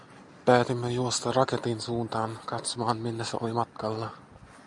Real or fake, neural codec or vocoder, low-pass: real; none; 10.8 kHz